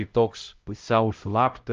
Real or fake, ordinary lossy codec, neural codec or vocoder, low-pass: fake; Opus, 24 kbps; codec, 16 kHz, 0.5 kbps, X-Codec, HuBERT features, trained on LibriSpeech; 7.2 kHz